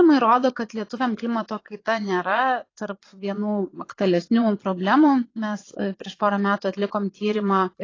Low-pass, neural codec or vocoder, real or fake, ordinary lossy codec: 7.2 kHz; vocoder, 22.05 kHz, 80 mel bands, Vocos; fake; AAC, 32 kbps